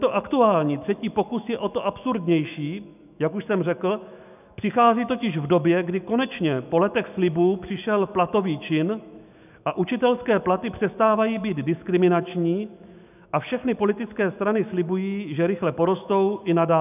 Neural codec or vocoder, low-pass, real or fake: autoencoder, 48 kHz, 128 numbers a frame, DAC-VAE, trained on Japanese speech; 3.6 kHz; fake